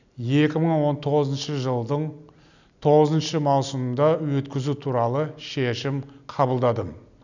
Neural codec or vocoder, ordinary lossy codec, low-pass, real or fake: none; none; 7.2 kHz; real